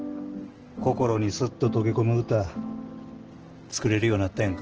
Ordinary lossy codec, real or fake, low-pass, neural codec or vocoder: Opus, 16 kbps; real; 7.2 kHz; none